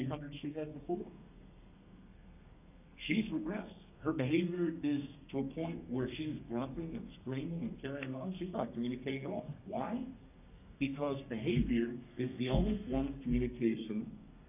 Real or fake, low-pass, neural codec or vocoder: fake; 3.6 kHz; codec, 32 kHz, 1.9 kbps, SNAC